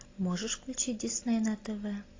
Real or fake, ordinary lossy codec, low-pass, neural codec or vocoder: real; AAC, 32 kbps; 7.2 kHz; none